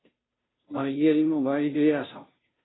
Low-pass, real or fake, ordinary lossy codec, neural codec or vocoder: 7.2 kHz; fake; AAC, 16 kbps; codec, 16 kHz, 0.5 kbps, FunCodec, trained on Chinese and English, 25 frames a second